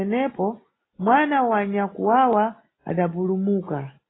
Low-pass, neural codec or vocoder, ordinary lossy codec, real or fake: 7.2 kHz; none; AAC, 16 kbps; real